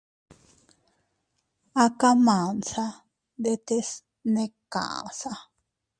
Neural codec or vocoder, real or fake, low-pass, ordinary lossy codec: none; real; 9.9 kHz; Opus, 64 kbps